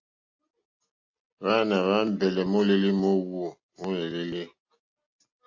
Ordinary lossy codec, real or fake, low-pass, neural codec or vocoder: AAC, 48 kbps; real; 7.2 kHz; none